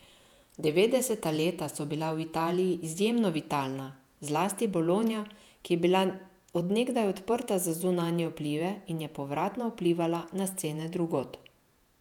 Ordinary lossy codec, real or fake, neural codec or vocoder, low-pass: none; fake; vocoder, 48 kHz, 128 mel bands, Vocos; 19.8 kHz